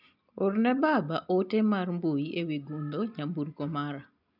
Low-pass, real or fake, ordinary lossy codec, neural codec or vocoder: 5.4 kHz; real; none; none